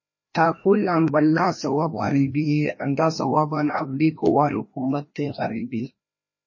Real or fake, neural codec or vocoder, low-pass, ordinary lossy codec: fake; codec, 16 kHz, 1 kbps, FreqCodec, larger model; 7.2 kHz; MP3, 32 kbps